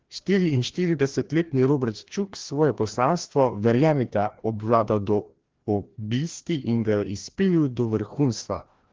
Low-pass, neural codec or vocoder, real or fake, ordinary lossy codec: 7.2 kHz; codec, 16 kHz, 1 kbps, FreqCodec, larger model; fake; Opus, 16 kbps